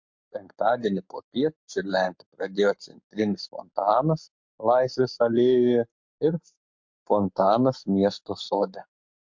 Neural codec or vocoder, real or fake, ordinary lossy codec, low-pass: codec, 44.1 kHz, 7.8 kbps, Pupu-Codec; fake; MP3, 48 kbps; 7.2 kHz